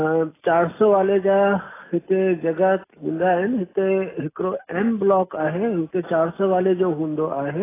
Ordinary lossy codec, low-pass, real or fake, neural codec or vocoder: AAC, 16 kbps; 3.6 kHz; real; none